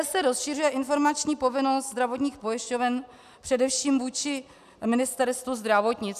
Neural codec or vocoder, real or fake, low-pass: none; real; 14.4 kHz